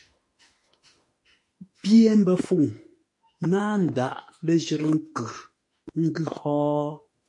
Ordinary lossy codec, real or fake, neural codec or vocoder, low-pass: MP3, 48 kbps; fake; autoencoder, 48 kHz, 32 numbers a frame, DAC-VAE, trained on Japanese speech; 10.8 kHz